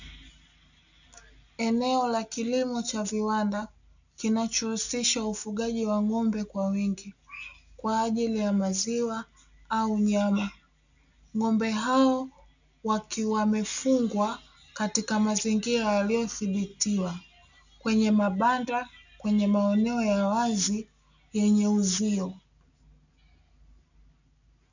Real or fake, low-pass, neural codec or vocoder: real; 7.2 kHz; none